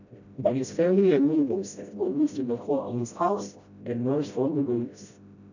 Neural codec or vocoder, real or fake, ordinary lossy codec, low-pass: codec, 16 kHz, 0.5 kbps, FreqCodec, smaller model; fake; none; 7.2 kHz